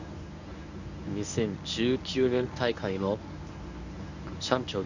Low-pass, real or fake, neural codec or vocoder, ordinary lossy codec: 7.2 kHz; fake; codec, 24 kHz, 0.9 kbps, WavTokenizer, medium speech release version 1; none